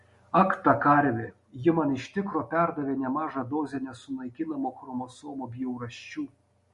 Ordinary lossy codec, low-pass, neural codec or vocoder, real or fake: MP3, 48 kbps; 14.4 kHz; none; real